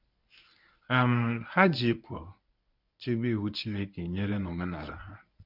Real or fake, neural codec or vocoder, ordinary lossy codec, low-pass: fake; codec, 24 kHz, 0.9 kbps, WavTokenizer, medium speech release version 1; none; 5.4 kHz